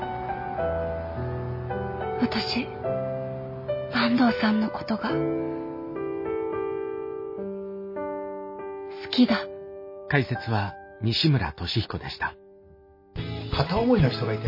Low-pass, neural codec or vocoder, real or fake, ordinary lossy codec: 5.4 kHz; none; real; MP3, 24 kbps